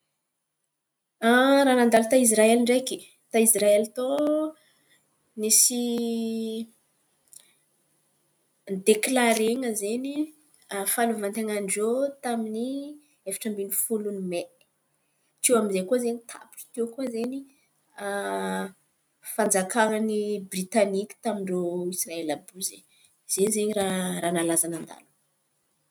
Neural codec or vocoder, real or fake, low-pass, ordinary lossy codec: none; real; none; none